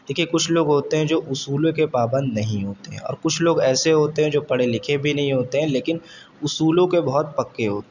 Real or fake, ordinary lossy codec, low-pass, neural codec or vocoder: real; none; 7.2 kHz; none